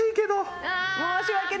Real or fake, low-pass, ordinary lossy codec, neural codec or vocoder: real; none; none; none